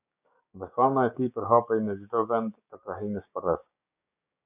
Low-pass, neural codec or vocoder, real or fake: 3.6 kHz; codec, 16 kHz, 6 kbps, DAC; fake